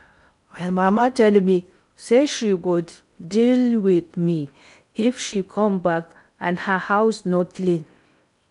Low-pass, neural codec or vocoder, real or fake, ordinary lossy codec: 10.8 kHz; codec, 16 kHz in and 24 kHz out, 0.6 kbps, FocalCodec, streaming, 4096 codes; fake; none